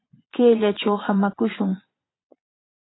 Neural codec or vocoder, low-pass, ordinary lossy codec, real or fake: vocoder, 22.05 kHz, 80 mel bands, Vocos; 7.2 kHz; AAC, 16 kbps; fake